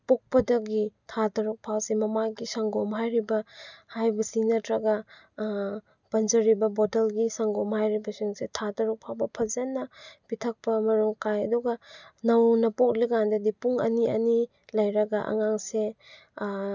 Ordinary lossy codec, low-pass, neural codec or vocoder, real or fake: none; 7.2 kHz; none; real